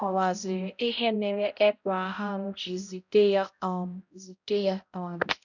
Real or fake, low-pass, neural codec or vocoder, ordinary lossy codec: fake; 7.2 kHz; codec, 16 kHz, 0.5 kbps, X-Codec, HuBERT features, trained on balanced general audio; none